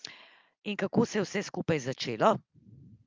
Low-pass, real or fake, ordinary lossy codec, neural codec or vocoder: 7.2 kHz; real; Opus, 24 kbps; none